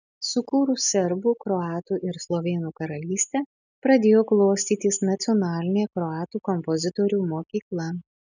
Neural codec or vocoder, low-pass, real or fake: none; 7.2 kHz; real